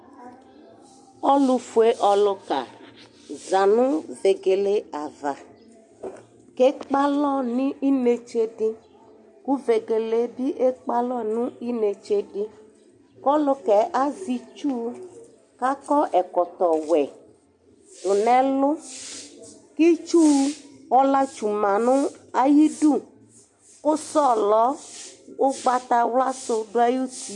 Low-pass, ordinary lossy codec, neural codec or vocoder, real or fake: 10.8 kHz; MP3, 48 kbps; none; real